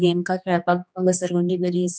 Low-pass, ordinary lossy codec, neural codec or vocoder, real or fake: none; none; codec, 16 kHz, 2 kbps, X-Codec, HuBERT features, trained on general audio; fake